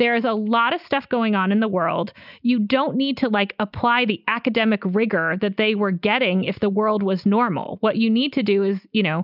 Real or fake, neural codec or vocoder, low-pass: real; none; 5.4 kHz